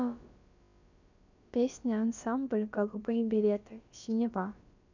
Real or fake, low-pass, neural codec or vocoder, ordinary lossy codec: fake; 7.2 kHz; codec, 16 kHz, about 1 kbps, DyCAST, with the encoder's durations; none